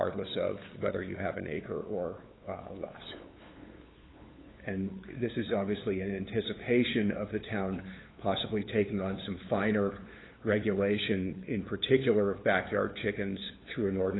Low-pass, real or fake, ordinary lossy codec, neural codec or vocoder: 7.2 kHz; fake; AAC, 16 kbps; codec, 16 kHz, 8 kbps, FunCodec, trained on LibriTTS, 25 frames a second